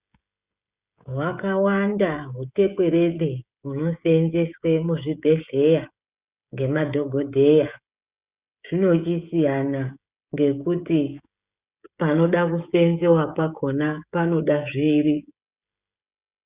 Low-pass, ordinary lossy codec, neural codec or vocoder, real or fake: 3.6 kHz; Opus, 64 kbps; codec, 16 kHz, 16 kbps, FreqCodec, smaller model; fake